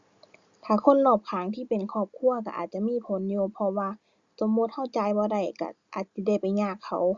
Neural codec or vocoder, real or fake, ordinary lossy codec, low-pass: none; real; Opus, 64 kbps; 7.2 kHz